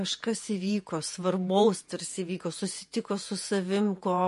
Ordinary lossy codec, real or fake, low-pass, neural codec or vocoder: MP3, 48 kbps; fake; 14.4 kHz; vocoder, 48 kHz, 128 mel bands, Vocos